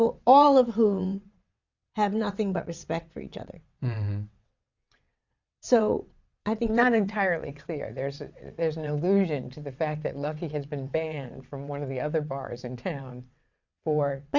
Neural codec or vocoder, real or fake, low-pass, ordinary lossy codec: vocoder, 22.05 kHz, 80 mel bands, WaveNeXt; fake; 7.2 kHz; Opus, 64 kbps